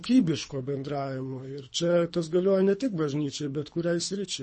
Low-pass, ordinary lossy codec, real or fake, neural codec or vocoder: 10.8 kHz; MP3, 32 kbps; fake; codec, 24 kHz, 3 kbps, HILCodec